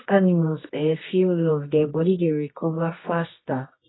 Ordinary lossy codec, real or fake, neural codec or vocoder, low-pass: AAC, 16 kbps; fake; codec, 24 kHz, 0.9 kbps, WavTokenizer, medium music audio release; 7.2 kHz